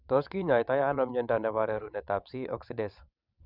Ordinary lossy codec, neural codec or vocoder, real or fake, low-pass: none; vocoder, 22.05 kHz, 80 mel bands, WaveNeXt; fake; 5.4 kHz